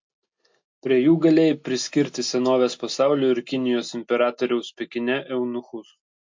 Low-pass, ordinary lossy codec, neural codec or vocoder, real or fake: 7.2 kHz; MP3, 48 kbps; none; real